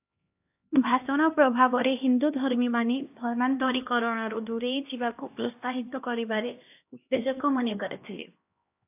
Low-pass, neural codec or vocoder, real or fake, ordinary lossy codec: 3.6 kHz; codec, 16 kHz, 1 kbps, X-Codec, HuBERT features, trained on LibriSpeech; fake; AAC, 32 kbps